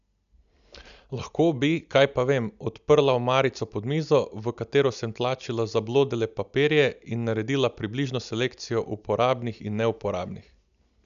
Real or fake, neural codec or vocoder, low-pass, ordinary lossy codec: real; none; 7.2 kHz; none